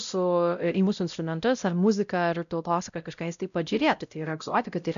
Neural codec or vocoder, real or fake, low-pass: codec, 16 kHz, 0.5 kbps, X-Codec, WavLM features, trained on Multilingual LibriSpeech; fake; 7.2 kHz